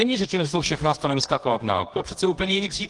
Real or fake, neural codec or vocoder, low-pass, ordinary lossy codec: fake; codec, 24 kHz, 0.9 kbps, WavTokenizer, medium music audio release; 10.8 kHz; Opus, 24 kbps